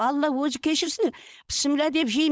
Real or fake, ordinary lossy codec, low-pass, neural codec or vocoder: fake; none; none; codec, 16 kHz, 4.8 kbps, FACodec